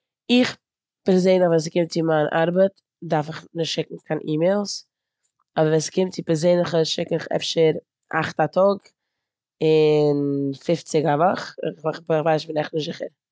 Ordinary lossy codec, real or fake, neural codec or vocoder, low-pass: none; real; none; none